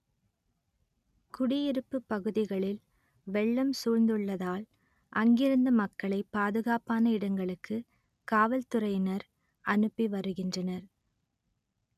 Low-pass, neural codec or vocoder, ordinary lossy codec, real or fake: 14.4 kHz; none; none; real